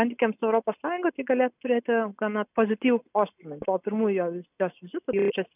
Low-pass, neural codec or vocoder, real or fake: 3.6 kHz; none; real